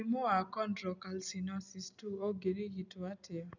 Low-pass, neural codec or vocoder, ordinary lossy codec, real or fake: 7.2 kHz; none; none; real